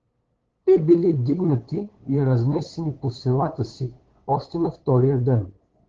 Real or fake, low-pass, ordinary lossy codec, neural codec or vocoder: fake; 7.2 kHz; Opus, 16 kbps; codec, 16 kHz, 8 kbps, FunCodec, trained on LibriTTS, 25 frames a second